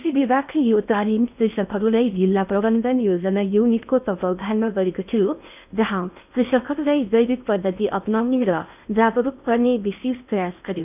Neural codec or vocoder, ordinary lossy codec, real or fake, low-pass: codec, 16 kHz in and 24 kHz out, 0.6 kbps, FocalCodec, streaming, 4096 codes; none; fake; 3.6 kHz